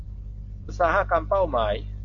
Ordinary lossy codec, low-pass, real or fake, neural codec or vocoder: AAC, 64 kbps; 7.2 kHz; real; none